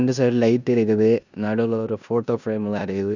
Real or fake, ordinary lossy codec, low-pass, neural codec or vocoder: fake; none; 7.2 kHz; codec, 16 kHz in and 24 kHz out, 0.9 kbps, LongCat-Audio-Codec, four codebook decoder